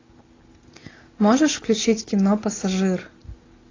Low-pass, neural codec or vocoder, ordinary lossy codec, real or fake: 7.2 kHz; none; AAC, 32 kbps; real